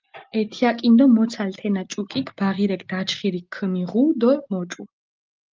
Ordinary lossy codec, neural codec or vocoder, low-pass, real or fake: Opus, 32 kbps; none; 7.2 kHz; real